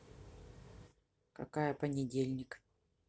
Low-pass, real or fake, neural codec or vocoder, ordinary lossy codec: none; real; none; none